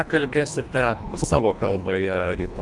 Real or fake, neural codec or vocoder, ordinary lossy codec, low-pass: fake; codec, 24 kHz, 1.5 kbps, HILCodec; MP3, 96 kbps; 10.8 kHz